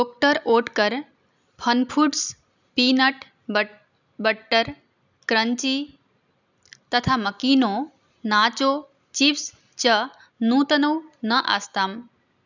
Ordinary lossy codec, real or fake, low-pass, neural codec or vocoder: none; real; 7.2 kHz; none